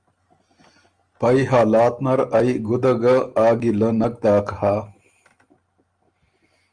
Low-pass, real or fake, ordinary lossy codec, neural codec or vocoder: 9.9 kHz; real; Opus, 32 kbps; none